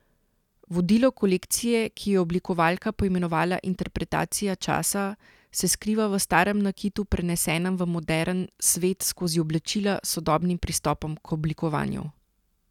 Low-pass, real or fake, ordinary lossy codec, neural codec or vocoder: 19.8 kHz; real; none; none